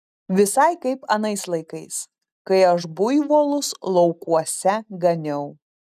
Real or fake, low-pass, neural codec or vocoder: real; 14.4 kHz; none